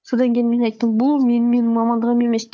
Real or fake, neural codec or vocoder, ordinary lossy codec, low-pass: fake; codec, 16 kHz, 4 kbps, FunCodec, trained on Chinese and English, 50 frames a second; none; none